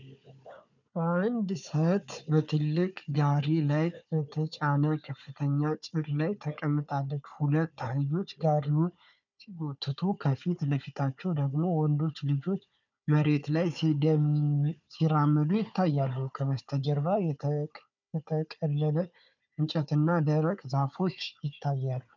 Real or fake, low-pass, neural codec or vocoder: fake; 7.2 kHz; codec, 16 kHz, 4 kbps, FunCodec, trained on Chinese and English, 50 frames a second